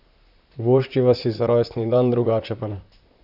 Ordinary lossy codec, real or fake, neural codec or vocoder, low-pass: Opus, 64 kbps; fake; vocoder, 44.1 kHz, 128 mel bands, Pupu-Vocoder; 5.4 kHz